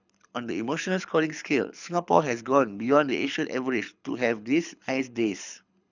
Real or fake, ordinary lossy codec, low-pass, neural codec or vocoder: fake; none; 7.2 kHz; codec, 24 kHz, 6 kbps, HILCodec